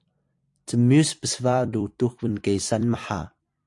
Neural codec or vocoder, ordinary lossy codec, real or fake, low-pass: vocoder, 44.1 kHz, 128 mel bands every 256 samples, BigVGAN v2; AAC, 64 kbps; fake; 10.8 kHz